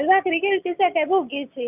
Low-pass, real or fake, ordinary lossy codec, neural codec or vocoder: 3.6 kHz; real; none; none